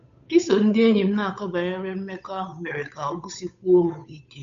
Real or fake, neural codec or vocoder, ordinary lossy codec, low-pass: fake; codec, 16 kHz, 8 kbps, FunCodec, trained on Chinese and English, 25 frames a second; none; 7.2 kHz